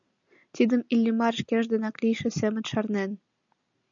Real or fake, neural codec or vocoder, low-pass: real; none; 7.2 kHz